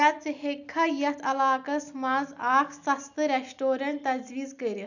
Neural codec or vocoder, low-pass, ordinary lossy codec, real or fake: none; 7.2 kHz; none; real